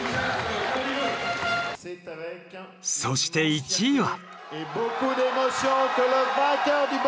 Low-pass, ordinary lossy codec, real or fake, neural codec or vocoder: none; none; real; none